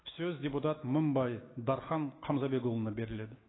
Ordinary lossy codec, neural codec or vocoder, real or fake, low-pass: AAC, 16 kbps; autoencoder, 48 kHz, 128 numbers a frame, DAC-VAE, trained on Japanese speech; fake; 7.2 kHz